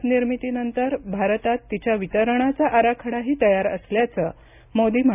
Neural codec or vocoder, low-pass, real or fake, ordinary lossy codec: none; 3.6 kHz; real; none